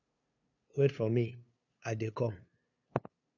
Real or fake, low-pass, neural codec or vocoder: fake; 7.2 kHz; codec, 16 kHz, 2 kbps, FunCodec, trained on LibriTTS, 25 frames a second